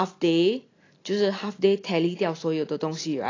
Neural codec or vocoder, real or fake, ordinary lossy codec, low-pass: none; real; AAC, 32 kbps; 7.2 kHz